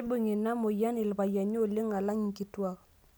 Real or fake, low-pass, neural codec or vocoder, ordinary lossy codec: real; none; none; none